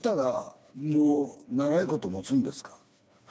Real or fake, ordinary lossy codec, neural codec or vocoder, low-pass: fake; none; codec, 16 kHz, 2 kbps, FreqCodec, smaller model; none